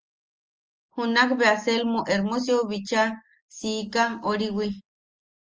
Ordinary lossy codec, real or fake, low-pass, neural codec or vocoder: Opus, 24 kbps; real; 7.2 kHz; none